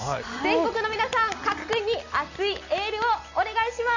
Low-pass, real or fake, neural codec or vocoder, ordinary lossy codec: 7.2 kHz; real; none; none